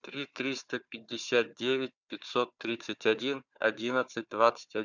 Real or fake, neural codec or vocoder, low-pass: fake; codec, 16 kHz, 4 kbps, FunCodec, trained on Chinese and English, 50 frames a second; 7.2 kHz